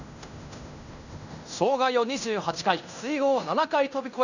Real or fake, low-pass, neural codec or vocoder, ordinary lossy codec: fake; 7.2 kHz; codec, 16 kHz in and 24 kHz out, 0.9 kbps, LongCat-Audio-Codec, fine tuned four codebook decoder; none